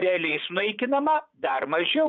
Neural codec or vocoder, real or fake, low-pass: vocoder, 44.1 kHz, 80 mel bands, Vocos; fake; 7.2 kHz